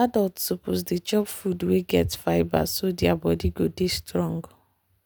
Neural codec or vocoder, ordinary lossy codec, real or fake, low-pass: none; none; real; none